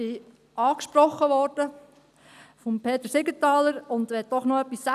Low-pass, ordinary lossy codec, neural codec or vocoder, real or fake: 14.4 kHz; none; none; real